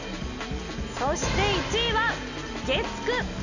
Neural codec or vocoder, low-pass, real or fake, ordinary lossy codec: none; 7.2 kHz; real; none